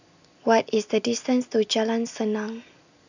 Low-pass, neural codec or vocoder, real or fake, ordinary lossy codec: 7.2 kHz; none; real; none